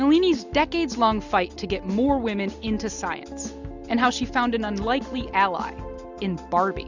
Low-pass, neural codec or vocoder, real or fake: 7.2 kHz; none; real